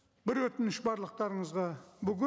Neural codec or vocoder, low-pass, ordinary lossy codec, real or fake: none; none; none; real